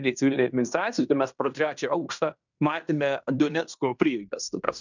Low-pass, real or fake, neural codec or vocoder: 7.2 kHz; fake; codec, 16 kHz in and 24 kHz out, 0.9 kbps, LongCat-Audio-Codec, fine tuned four codebook decoder